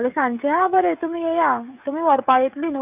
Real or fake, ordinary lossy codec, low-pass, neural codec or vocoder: fake; none; 3.6 kHz; codec, 16 kHz, 16 kbps, FreqCodec, smaller model